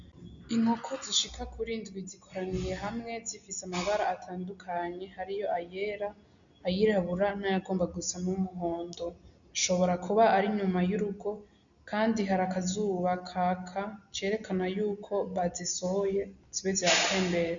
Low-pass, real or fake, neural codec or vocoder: 7.2 kHz; real; none